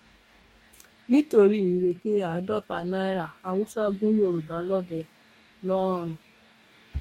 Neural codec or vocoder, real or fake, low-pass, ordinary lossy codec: codec, 44.1 kHz, 2.6 kbps, DAC; fake; 19.8 kHz; MP3, 64 kbps